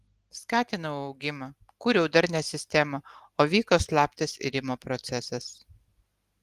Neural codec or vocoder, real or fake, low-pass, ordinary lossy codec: none; real; 14.4 kHz; Opus, 24 kbps